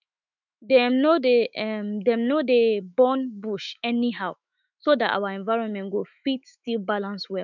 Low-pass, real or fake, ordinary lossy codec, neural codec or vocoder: 7.2 kHz; fake; none; autoencoder, 48 kHz, 128 numbers a frame, DAC-VAE, trained on Japanese speech